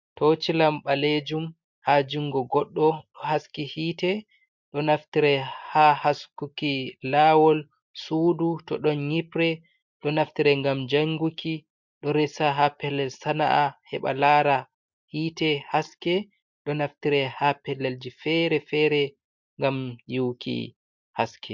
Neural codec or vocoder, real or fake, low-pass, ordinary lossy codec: none; real; 7.2 kHz; MP3, 64 kbps